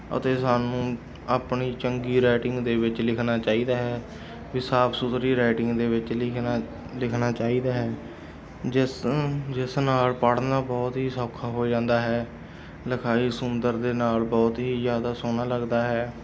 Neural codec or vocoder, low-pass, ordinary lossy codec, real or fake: none; none; none; real